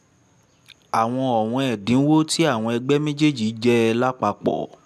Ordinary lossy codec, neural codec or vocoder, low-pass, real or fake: none; none; 14.4 kHz; real